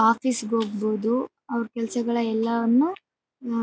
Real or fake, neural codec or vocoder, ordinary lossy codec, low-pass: real; none; none; none